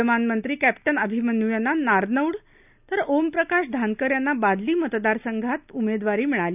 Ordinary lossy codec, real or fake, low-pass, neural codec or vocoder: none; real; 3.6 kHz; none